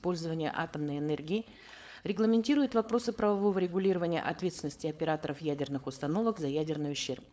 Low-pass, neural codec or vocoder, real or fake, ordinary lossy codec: none; codec, 16 kHz, 4.8 kbps, FACodec; fake; none